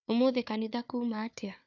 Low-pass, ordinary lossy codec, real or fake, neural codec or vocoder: 7.2 kHz; none; fake; codec, 16 kHz, 4 kbps, FunCodec, trained on Chinese and English, 50 frames a second